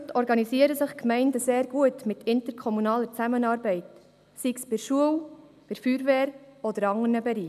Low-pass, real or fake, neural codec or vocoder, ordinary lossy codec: 14.4 kHz; real; none; none